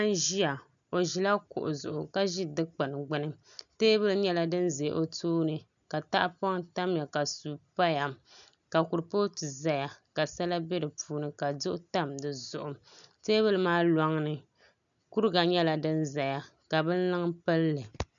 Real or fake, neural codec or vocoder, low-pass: real; none; 7.2 kHz